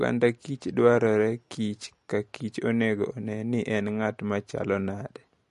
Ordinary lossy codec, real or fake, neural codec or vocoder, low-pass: MP3, 48 kbps; real; none; 14.4 kHz